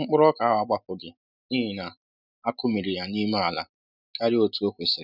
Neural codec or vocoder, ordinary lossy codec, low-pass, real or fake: none; none; 5.4 kHz; real